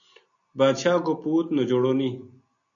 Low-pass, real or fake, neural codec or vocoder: 7.2 kHz; real; none